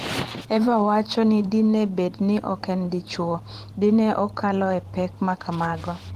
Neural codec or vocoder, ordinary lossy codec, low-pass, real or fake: vocoder, 44.1 kHz, 128 mel bands every 512 samples, BigVGAN v2; Opus, 16 kbps; 14.4 kHz; fake